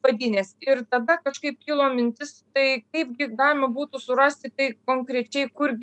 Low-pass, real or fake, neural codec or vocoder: 10.8 kHz; real; none